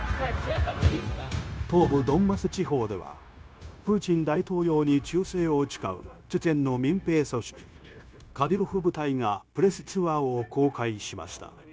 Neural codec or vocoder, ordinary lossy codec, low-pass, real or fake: codec, 16 kHz, 0.9 kbps, LongCat-Audio-Codec; none; none; fake